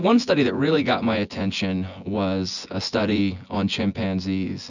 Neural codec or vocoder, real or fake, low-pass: vocoder, 24 kHz, 100 mel bands, Vocos; fake; 7.2 kHz